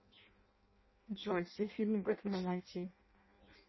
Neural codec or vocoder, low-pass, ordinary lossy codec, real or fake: codec, 16 kHz in and 24 kHz out, 0.6 kbps, FireRedTTS-2 codec; 7.2 kHz; MP3, 24 kbps; fake